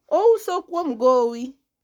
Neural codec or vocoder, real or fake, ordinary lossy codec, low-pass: codec, 44.1 kHz, 7.8 kbps, Pupu-Codec; fake; none; 19.8 kHz